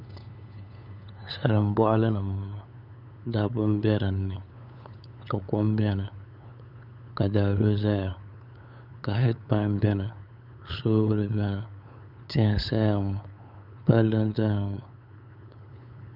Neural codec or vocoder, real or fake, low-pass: codec, 16 kHz, 8 kbps, FunCodec, trained on LibriTTS, 25 frames a second; fake; 5.4 kHz